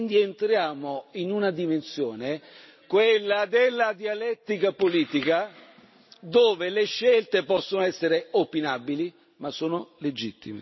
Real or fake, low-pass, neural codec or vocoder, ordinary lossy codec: real; 7.2 kHz; none; MP3, 24 kbps